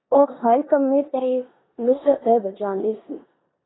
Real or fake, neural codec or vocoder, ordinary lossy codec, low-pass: fake; codec, 16 kHz in and 24 kHz out, 0.9 kbps, LongCat-Audio-Codec, four codebook decoder; AAC, 16 kbps; 7.2 kHz